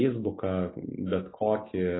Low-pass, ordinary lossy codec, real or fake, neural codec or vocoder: 7.2 kHz; AAC, 16 kbps; real; none